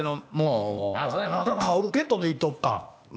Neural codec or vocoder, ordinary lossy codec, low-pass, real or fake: codec, 16 kHz, 0.8 kbps, ZipCodec; none; none; fake